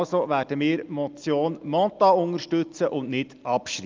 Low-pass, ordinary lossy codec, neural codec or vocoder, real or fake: 7.2 kHz; Opus, 24 kbps; none; real